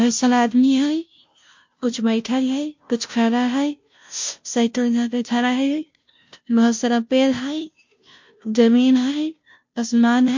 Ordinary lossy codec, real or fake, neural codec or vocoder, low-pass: MP3, 48 kbps; fake; codec, 16 kHz, 0.5 kbps, FunCodec, trained on Chinese and English, 25 frames a second; 7.2 kHz